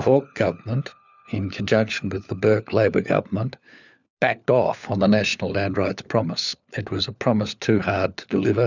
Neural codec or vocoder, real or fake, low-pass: codec, 16 kHz, 4 kbps, FunCodec, trained on LibriTTS, 50 frames a second; fake; 7.2 kHz